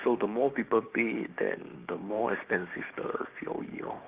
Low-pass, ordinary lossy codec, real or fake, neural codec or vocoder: 3.6 kHz; Opus, 24 kbps; fake; codec, 16 kHz, 6 kbps, DAC